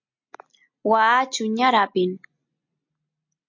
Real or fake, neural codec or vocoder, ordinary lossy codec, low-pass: real; none; MP3, 48 kbps; 7.2 kHz